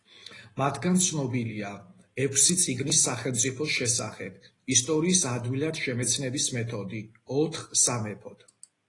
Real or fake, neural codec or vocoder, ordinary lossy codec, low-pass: real; none; AAC, 32 kbps; 10.8 kHz